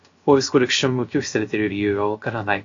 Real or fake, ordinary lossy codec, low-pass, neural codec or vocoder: fake; AAC, 48 kbps; 7.2 kHz; codec, 16 kHz, 0.3 kbps, FocalCodec